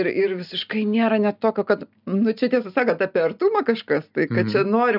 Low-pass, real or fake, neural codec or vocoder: 5.4 kHz; real; none